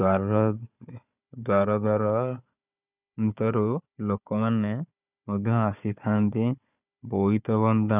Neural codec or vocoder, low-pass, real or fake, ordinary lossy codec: codec, 16 kHz, 4 kbps, FunCodec, trained on Chinese and English, 50 frames a second; 3.6 kHz; fake; none